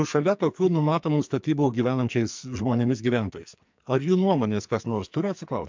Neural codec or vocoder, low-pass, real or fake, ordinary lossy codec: codec, 44.1 kHz, 2.6 kbps, SNAC; 7.2 kHz; fake; MP3, 64 kbps